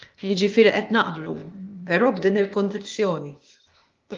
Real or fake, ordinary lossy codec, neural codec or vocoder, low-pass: fake; Opus, 32 kbps; codec, 16 kHz, 0.8 kbps, ZipCodec; 7.2 kHz